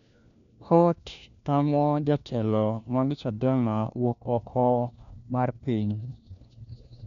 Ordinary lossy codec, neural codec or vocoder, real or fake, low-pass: none; codec, 16 kHz, 1 kbps, FunCodec, trained on LibriTTS, 50 frames a second; fake; 7.2 kHz